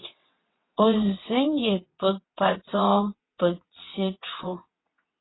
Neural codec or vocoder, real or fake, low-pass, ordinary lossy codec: vocoder, 22.05 kHz, 80 mel bands, WaveNeXt; fake; 7.2 kHz; AAC, 16 kbps